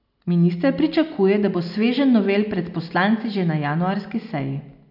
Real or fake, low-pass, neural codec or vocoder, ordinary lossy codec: real; 5.4 kHz; none; none